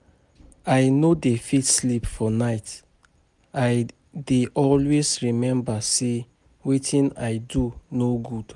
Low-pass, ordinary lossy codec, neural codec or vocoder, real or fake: 10.8 kHz; none; none; real